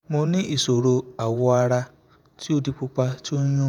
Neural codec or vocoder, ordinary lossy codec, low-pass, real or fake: vocoder, 48 kHz, 128 mel bands, Vocos; none; 19.8 kHz; fake